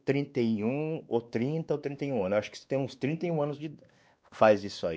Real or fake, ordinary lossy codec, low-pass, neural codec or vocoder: fake; none; none; codec, 16 kHz, 2 kbps, X-Codec, WavLM features, trained on Multilingual LibriSpeech